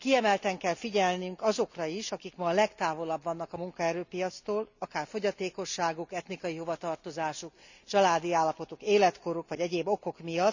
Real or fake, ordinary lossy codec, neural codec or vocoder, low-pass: real; none; none; 7.2 kHz